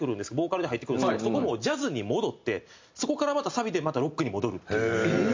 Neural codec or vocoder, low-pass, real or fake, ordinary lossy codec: none; 7.2 kHz; real; MP3, 64 kbps